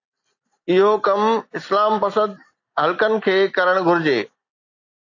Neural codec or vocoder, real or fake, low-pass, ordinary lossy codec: none; real; 7.2 kHz; AAC, 48 kbps